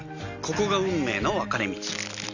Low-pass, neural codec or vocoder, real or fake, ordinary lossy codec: 7.2 kHz; none; real; none